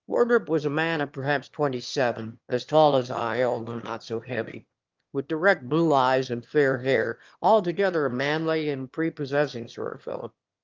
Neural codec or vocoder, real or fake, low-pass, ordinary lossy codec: autoencoder, 22.05 kHz, a latent of 192 numbers a frame, VITS, trained on one speaker; fake; 7.2 kHz; Opus, 32 kbps